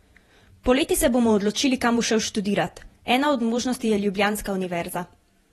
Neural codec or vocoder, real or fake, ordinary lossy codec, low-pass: vocoder, 48 kHz, 128 mel bands, Vocos; fake; AAC, 32 kbps; 19.8 kHz